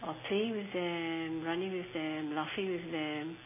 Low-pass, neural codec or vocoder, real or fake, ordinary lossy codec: 3.6 kHz; none; real; MP3, 16 kbps